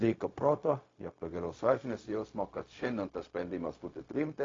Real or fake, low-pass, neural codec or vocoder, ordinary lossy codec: fake; 7.2 kHz; codec, 16 kHz, 0.4 kbps, LongCat-Audio-Codec; AAC, 32 kbps